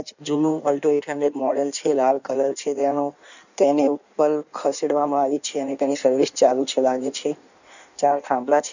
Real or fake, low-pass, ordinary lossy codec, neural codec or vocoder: fake; 7.2 kHz; none; codec, 16 kHz in and 24 kHz out, 1.1 kbps, FireRedTTS-2 codec